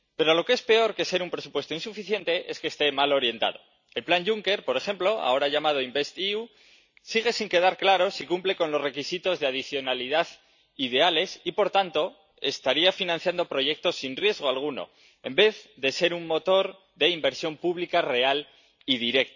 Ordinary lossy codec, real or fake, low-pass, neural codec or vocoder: none; real; 7.2 kHz; none